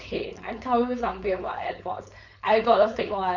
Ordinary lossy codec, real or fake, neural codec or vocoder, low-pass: none; fake; codec, 16 kHz, 4.8 kbps, FACodec; 7.2 kHz